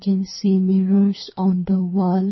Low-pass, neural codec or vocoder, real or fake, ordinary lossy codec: 7.2 kHz; codec, 24 kHz, 3 kbps, HILCodec; fake; MP3, 24 kbps